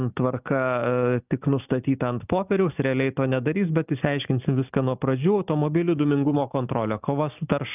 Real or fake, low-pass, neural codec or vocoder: real; 3.6 kHz; none